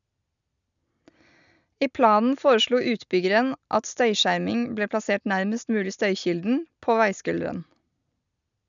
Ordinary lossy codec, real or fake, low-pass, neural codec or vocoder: none; real; 7.2 kHz; none